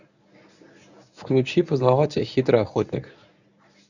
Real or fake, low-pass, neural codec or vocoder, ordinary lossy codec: fake; 7.2 kHz; codec, 24 kHz, 0.9 kbps, WavTokenizer, medium speech release version 1; none